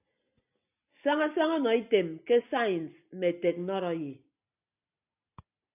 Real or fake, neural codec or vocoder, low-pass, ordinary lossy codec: real; none; 3.6 kHz; AAC, 32 kbps